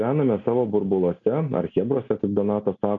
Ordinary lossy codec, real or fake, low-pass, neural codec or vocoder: MP3, 96 kbps; real; 7.2 kHz; none